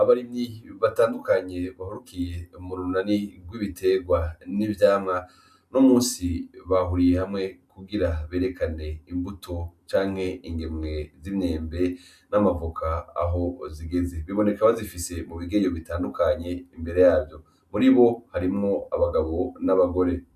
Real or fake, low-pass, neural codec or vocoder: real; 14.4 kHz; none